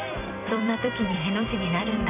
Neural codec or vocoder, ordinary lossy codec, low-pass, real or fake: vocoder, 44.1 kHz, 128 mel bands every 256 samples, BigVGAN v2; none; 3.6 kHz; fake